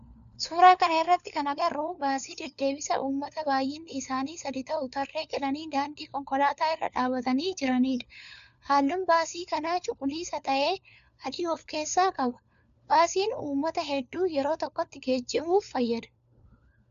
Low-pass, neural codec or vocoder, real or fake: 7.2 kHz; codec, 16 kHz, 4 kbps, FunCodec, trained on LibriTTS, 50 frames a second; fake